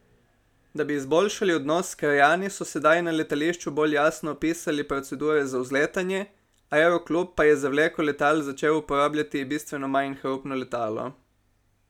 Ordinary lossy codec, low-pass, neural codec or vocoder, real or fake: none; 19.8 kHz; none; real